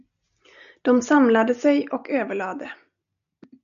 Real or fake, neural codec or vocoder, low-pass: real; none; 7.2 kHz